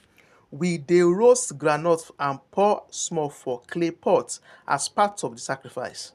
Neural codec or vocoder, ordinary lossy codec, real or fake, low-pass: none; none; real; 14.4 kHz